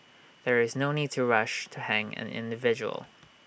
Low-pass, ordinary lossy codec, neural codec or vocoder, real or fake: none; none; none; real